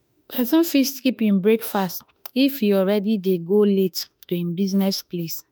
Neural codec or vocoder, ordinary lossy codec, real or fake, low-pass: autoencoder, 48 kHz, 32 numbers a frame, DAC-VAE, trained on Japanese speech; none; fake; none